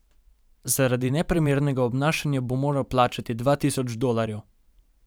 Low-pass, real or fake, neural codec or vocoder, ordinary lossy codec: none; fake; vocoder, 44.1 kHz, 128 mel bands every 512 samples, BigVGAN v2; none